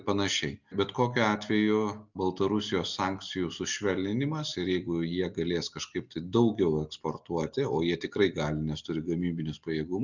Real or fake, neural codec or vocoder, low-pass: real; none; 7.2 kHz